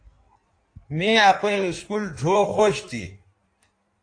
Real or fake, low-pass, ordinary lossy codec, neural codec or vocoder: fake; 9.9 kHz; AAC, 48 kbps; codec, 16 kHz in and 24 kHz out, 1.1 kbps, FireRedTTS-2 codec